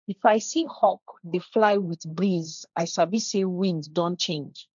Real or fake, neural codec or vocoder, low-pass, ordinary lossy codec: fake; codec, 16 kHz, 1.1 kbps, Voila-Tokenizer; 7.2 kHz; none